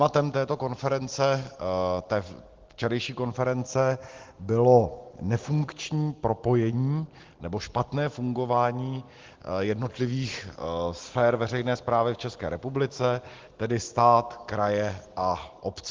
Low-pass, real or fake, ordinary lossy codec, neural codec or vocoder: 7.2 kHz; real; Opus, 16 kbps; none